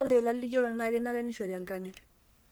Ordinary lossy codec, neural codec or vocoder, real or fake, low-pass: none; codec, 44.1 kHz, 1.7 kbps, Pupu-Codec; fake; none